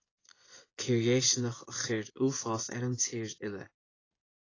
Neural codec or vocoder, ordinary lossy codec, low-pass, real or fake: vocoder, 24 kHz, 100 mel bands, Vocos; AAC, 32 kbps; 7.2 kHz; fake